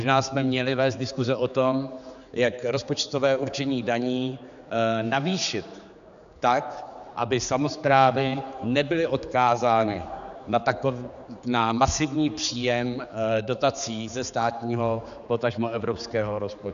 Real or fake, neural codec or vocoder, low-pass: fake; codec, 16 kHz, 4 kbps, X-Codec, HuBERT features, trained on general audio; 7.2 kHz